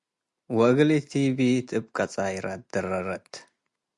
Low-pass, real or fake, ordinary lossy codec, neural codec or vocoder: 10.8 kHz; real; Opus, 64 kbps; none